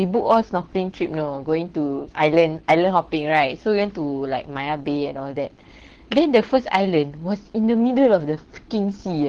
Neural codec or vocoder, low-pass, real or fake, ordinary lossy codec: codec, 24 kHz, 6 kbps, HILCodec; 9.9 kHz; fake; Opus, 16 kbps